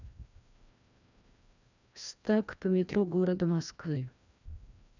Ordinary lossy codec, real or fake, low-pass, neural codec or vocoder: none; fake; 7.2 kHz; codec, 16 kHz, 1 kbps, FreqCodec, larger model